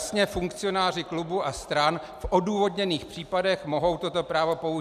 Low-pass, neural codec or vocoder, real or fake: 14.4 kHz; none; real